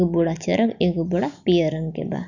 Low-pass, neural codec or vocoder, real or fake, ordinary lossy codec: 7.2 kHz; none; real; none